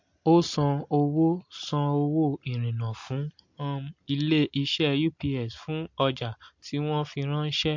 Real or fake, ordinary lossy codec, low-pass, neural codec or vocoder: real; MP3, 48 kbps; 7.2 kHz; none